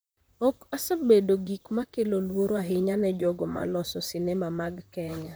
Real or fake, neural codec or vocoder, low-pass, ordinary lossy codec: fake; vocoder, 44.1 kHz, 128 mel bands, Pupu-Vocoder; none; none